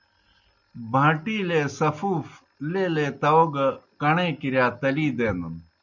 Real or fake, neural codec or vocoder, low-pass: real; none; 7.2 kHz